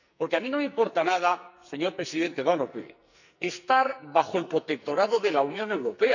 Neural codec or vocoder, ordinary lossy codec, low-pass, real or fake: codec, 44.1 kHz, 2.6 kbps, SNAC; none; 7.2 kHz; fake